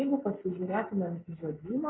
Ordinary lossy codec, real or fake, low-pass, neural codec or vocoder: AAC, 16 kbps; real; 7.2 kHz; none